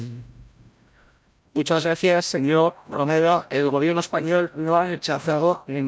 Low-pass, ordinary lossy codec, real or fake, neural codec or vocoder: none; none; fake; codec, 16 kHz, 0.5 kbps, FreqCodec, larger model